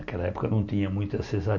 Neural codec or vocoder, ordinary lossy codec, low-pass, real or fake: none; none; 7.2 kHz; real